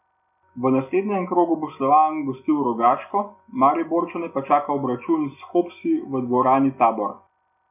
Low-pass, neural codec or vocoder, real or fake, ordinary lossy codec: 3.6 kHz; none; real; none